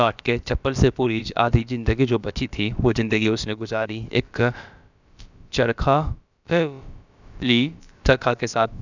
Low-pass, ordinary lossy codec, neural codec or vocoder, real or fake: 7.2 kHz; none; codec, 16 kHz, about 1 kbps, DyCAST, with the encoder's durations; fake